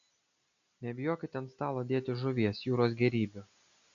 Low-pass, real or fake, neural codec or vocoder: 7.2 kHz; real; none